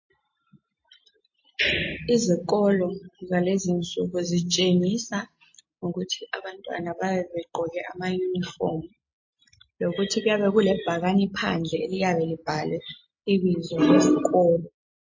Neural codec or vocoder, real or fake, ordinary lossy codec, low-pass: none; real; MP3, 32 kbps; 7.2 kHz